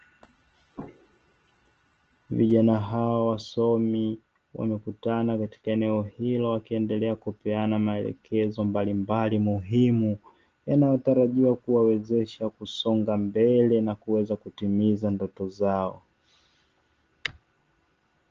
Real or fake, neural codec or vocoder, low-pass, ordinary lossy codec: real; none; 7.2 kHz; Opus, 16 kbps